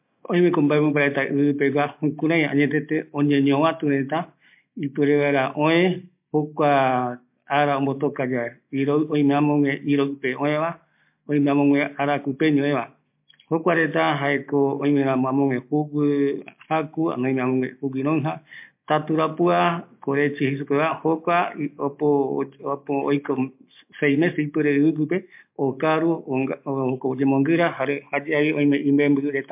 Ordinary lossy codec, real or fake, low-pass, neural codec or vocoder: MP3, 32 kbps; real; 3.6 kHz; none